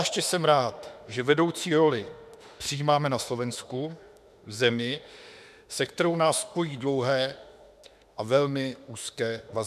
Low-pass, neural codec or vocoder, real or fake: 14.4 kHz; autoencoder, 48 kHz, 32 numbers a frame, DAC-VAE, trained on Japanese speech; fake